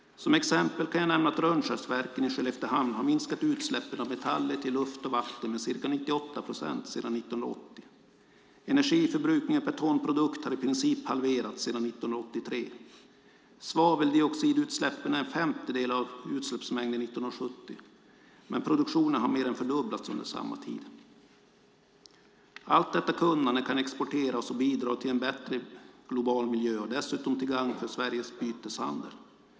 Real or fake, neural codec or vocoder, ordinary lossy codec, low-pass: real; none; none; none